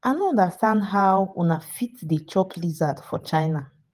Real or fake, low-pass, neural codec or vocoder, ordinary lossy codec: fake; 14.4 kHz; vocoder, 48 kHz, 128 mel bands, Vocos; Opus, 32 kbps